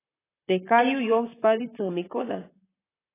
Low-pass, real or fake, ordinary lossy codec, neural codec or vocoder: 3.6 kHz; fake; AAC, 16 kbps; vocoder, 44.1 kHz, 128 mel bands, Pupu-Vocoder